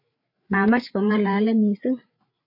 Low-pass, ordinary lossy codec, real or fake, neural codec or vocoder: 5.4 kHz; MP3, 32 kbps; fake; codec, 16 kHz, 8 kbps, FreqCodec, larger model